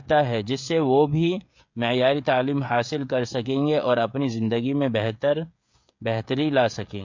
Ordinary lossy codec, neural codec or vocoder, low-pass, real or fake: MP3, 48 kbps; codec, 16 kHz, 16 kbps, FreqCodec, smaller model; 7.2 kHz; fake